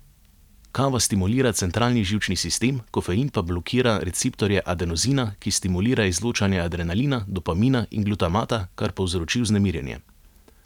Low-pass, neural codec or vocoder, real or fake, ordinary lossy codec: 19.8 kHz; none; real; none